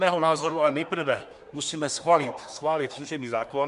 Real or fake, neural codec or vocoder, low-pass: fake; codec, 24 kHz, 1 kbps, SNAC; 10.8 kHz